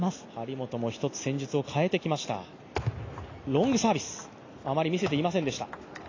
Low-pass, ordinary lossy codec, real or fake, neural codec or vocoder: 7.2 kHz; AAC, 32 kbps; real; none